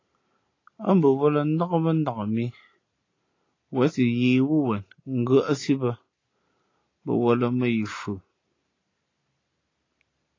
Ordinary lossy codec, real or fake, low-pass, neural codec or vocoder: AAC, 32 kbps; real; 7.2 kHz; none